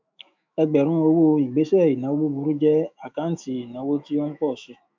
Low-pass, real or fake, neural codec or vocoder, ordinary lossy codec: 7.2 kHz; fake; autoencoder, 48 kHz, 128 numbers a frame, DAC-VAE, trained on Japanese speech; MP3, 64 kbps